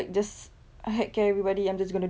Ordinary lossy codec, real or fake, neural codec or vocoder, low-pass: none; real; none; none